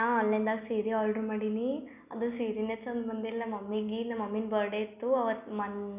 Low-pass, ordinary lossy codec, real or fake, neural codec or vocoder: 3.6 kHz; none; real; none